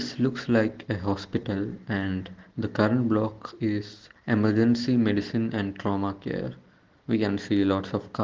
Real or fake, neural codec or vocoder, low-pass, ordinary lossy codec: real; none; 7.2 kHz; Opus, 16 kbps